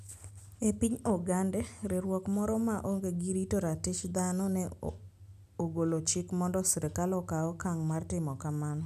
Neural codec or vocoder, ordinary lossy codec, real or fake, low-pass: none; none; real; 14.4 kHz